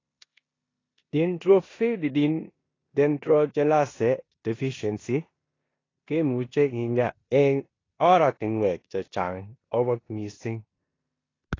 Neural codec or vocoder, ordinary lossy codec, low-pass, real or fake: codec, 16 kHz in and 24 kHz out, 0.9 kbps, LongCat-Audio-Codec, four codebook decoder; AAC, 32 kbps; 7.2 kHz; fake